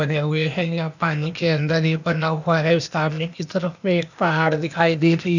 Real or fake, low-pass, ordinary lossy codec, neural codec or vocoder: fake; 7.2 kHz; none; codec, 16 kHz, 0.8 kbps, ZipCodec